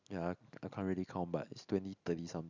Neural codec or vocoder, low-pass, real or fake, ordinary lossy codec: none; 7.2 kHz; real; none